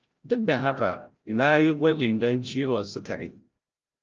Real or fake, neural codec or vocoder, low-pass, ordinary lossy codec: fake; codec, 16 kHz, 0.5 kbps, FreqCodec, larger model; 7.2 kHz; Opus, 24 kbps